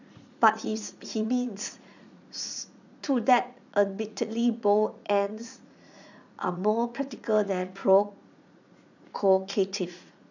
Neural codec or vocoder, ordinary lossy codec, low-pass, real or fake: vocoder, 44.1 kHz, 80 mel bands, Vocos; none; 7.2 kHz; fake